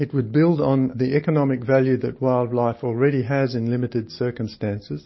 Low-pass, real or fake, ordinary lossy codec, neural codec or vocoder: 7.2 kHz; real; MP3, 24 kbps; none